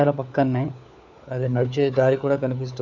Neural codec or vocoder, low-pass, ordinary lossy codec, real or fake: codec, 16 kHz, 4 kbps, FunCodec, trained on LibriTTS, 50 frames a second; 7.2 kHz; none; fake